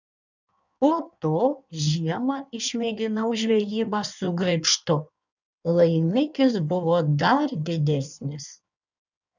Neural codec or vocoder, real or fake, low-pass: codec, 16 kHz in and 24 kHz out, 1.1 kbps, FireRedTTS-2 codec; fake; 7.2 kHz